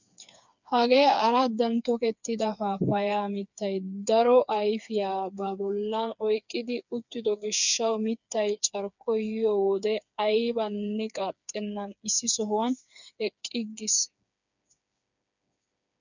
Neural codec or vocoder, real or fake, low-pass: codec, 16 kHz, 4 kbps, FreqCodec, smaller model; fake; 7.2 kHz